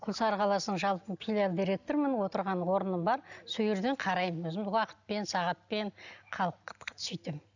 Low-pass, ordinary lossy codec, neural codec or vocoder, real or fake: 7.2 kHz; none; none; real